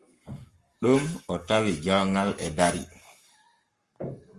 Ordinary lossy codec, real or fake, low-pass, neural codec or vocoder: Opus, 32 kbps; fake; 10.8 kHz; codec, 44.1 kHz, 7.8 kbps, Pupu-Codec